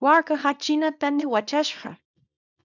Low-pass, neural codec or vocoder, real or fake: 7.2 kHz; codec, 24 kHz, 0.9 kbps, WavTokenizer, small release; fake